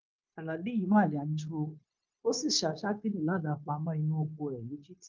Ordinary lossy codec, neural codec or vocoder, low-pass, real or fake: Opus, 24 kbps; codec, 16 kHz, 0.9 kbps, LongCat-Audio-Codec; 7.2 kHz; fake